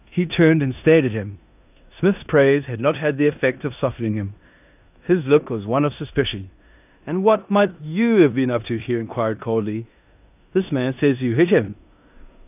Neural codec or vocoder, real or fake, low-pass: codec, 16 kHz in and 24 kHz out, 0.9 kbps, LongCat-Audio-Codec, four codebook decoder; fake; 3.6 kHz